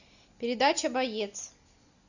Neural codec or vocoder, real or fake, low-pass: none; real; 7.2 kHz